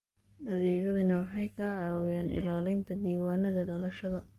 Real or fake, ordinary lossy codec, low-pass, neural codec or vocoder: fake; Opus, 32 kbps; 14.4 kHz; codec, 44.1 kHz, 3.4 kbps, Pupu-Codec